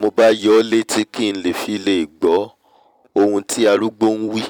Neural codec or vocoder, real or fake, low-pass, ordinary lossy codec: vocoder, 44.1 kHz, 128 mel bands every 256 samples, BigVGAN v2; fake; 19.8 kHz; none